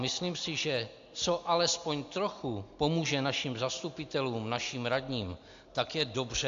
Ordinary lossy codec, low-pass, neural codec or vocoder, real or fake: AAC, 64 kbps; 7.2 kHz; none; real